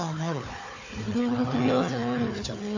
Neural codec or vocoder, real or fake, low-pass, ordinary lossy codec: codec, 16 kHz, 4 kbps, FunCodec, trained on Chinese and English, 50 frames a second; fake; 7.2 kHz; AAC, 48 kbps